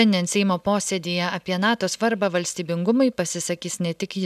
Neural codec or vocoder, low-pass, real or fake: none; 14.4 kHz; real